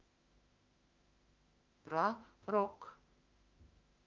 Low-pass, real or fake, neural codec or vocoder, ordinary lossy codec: 7.2 kHz; fake; autoencoder, 48 kHz, 32 numbers a frame, DAC-VAE, trained on Japanese speech; Opus, 24 kbps